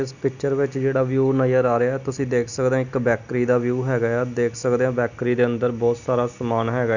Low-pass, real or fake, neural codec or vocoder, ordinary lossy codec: 7.2 kHz; real; none; none